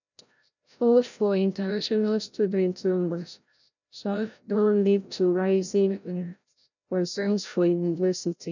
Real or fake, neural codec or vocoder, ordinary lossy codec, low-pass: fake; codec, 16 kHz, 0.5 kbps, FreqCodec, larger model; none; 7.2 kHz